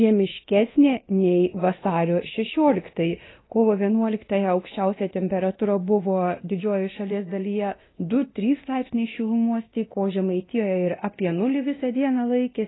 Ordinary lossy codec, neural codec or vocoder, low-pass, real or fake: AAC, 16 kbps; codec, 24 kHz, 0.9 kbps, DualCodec; 7.2 kHz; fake